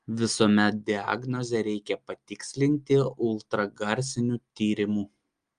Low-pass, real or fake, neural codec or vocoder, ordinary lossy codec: 9.9 kHz; real; none; Opus, 32 kbps